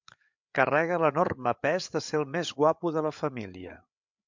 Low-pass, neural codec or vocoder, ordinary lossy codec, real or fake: 7.2 kHz; codec, 16 kHz, 8 kbps, FreqCodec, larger model; MP3, 64 kbps; fake